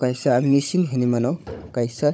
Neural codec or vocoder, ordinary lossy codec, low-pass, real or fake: codec, 16 kHz, 16 kbps, FunCodec, trained on Chinese and English, 50 frames a second; none; none; fake